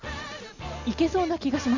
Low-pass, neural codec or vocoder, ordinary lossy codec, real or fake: 7.2 kHz; none; none; real